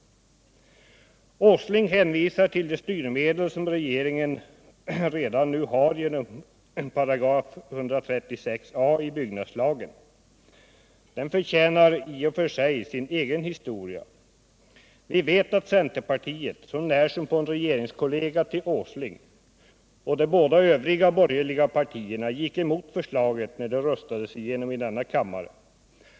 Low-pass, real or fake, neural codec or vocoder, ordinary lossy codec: none; real; none; none